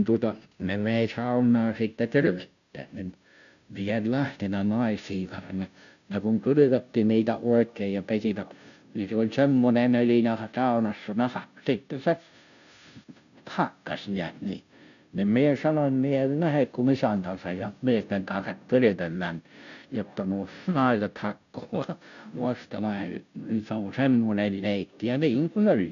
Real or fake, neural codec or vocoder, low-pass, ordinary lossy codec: fake; codec, 16 kHz, 0.5 kbps, FunCodec, trained on Chinese and English, 25 frames a second; 7.2 kHz; none